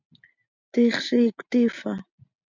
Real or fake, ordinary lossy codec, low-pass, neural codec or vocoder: real; MP3, 64 kbps; 7.2 kHz; none